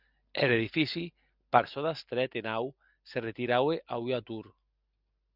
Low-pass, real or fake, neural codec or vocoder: 5.4 kHz; real; none